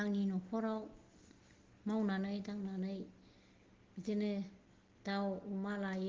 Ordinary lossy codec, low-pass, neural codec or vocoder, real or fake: Opus, 16 kbps; 7.2 kHz; none; real